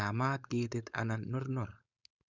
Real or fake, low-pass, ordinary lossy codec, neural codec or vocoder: fake; 7.2 kHz; none; codec, 16 kHz, 4.8 kbps, FACodec